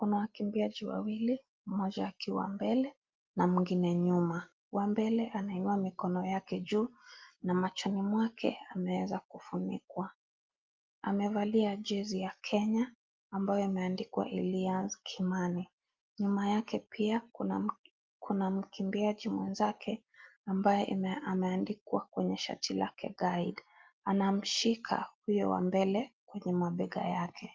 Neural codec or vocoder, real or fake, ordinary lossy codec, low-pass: none; real; Opus, 32 kbps; 7.2 kHz